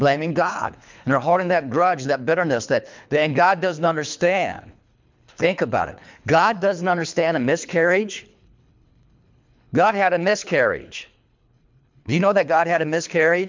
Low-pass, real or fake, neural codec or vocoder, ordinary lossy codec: 7.2 kHz; fake; codec, 24 kHz, 3 kbps, HILCodec; MP3, 64 kbps